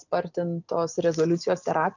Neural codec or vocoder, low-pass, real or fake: none; 7.2 kHz; real